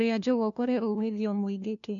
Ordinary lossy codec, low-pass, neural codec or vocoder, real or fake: none; 7.2 kHz; codec, 16 kHz, 1 kbps, FunCodec, trained on LibriTTS, 50 frames a second; fake